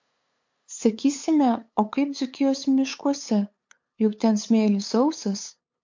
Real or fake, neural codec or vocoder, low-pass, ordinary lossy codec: fake; codec, 16 kHz, 8 kbps, FunCodec, trained on LibriTTS, 25 frames a second; 7.2 kHz; MP3, 48 kbps